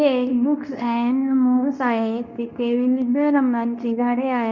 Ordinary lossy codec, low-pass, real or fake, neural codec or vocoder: none; 7.2 kHz; fake; codec, 24 kHz, 0.9 kbps, WavTokenizer, medium speech release version 2